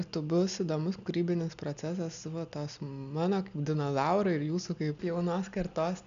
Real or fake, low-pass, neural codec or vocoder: real; 7.2 kHz; none